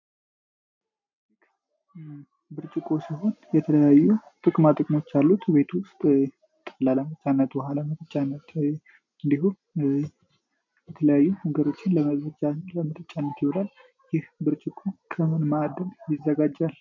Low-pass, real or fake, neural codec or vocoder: 7.2 kHz; real; none